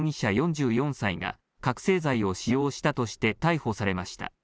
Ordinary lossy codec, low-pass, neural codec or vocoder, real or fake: none; none; none; real